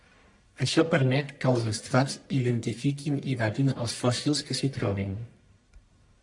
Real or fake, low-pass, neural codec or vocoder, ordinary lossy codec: fake; 10.8 kHz; codec, 44.1 kHz, 1.7 kbps, Pupu-Codec; AAC, 64 kbps